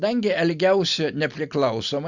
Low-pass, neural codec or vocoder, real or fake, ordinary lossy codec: 7.2 kHz; none; real; Opus, 64 kbps